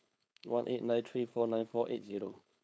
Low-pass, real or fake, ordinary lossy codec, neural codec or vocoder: none; fake; none; codec, 16 kHz, 4.8 kbps, FACodec